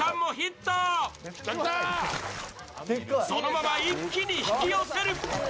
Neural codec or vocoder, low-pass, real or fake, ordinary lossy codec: none; none; real; none